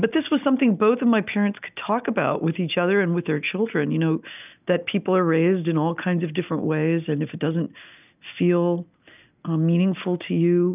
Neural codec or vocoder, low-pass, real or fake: none; 3.6 kHz; real